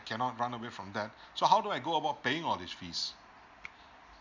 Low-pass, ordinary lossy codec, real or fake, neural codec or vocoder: 7.2 kHz; MP3, 64 kbps; real; none